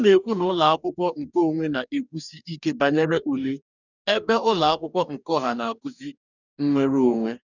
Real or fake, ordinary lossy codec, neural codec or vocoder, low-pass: fake; none; codec, 44.1 kHz, 2.6 kbps, DAC; 7.2 kHz